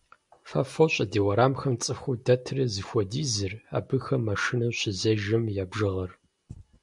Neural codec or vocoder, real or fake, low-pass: none; real; 10.8 kHz